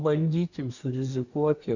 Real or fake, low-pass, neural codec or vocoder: fake; 7.2 kHz; codec, 24 kHz, 1 kbps, SNAC